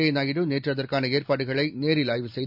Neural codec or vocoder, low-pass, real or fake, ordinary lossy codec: none; 5.4 kHz; real; none